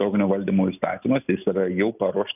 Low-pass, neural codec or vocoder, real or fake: 3.6 kHz; none; real